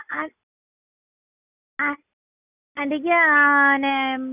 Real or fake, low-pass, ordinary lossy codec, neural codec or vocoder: real; 3.6 kHz; none; none